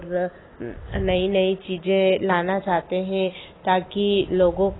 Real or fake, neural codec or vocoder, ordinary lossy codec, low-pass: real; none; AAC, 16 kbps; 7.2 kHz